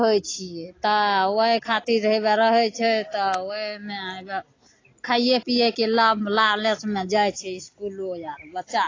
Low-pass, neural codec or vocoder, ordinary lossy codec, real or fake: 7.2 kHz; none; AAC, 32 kbps; real